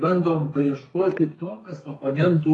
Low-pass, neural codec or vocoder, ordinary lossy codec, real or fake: 10.8 kHz; codec, 32 kHz, 1.9 kbps, SNAC; AAC, 32 kbps; fake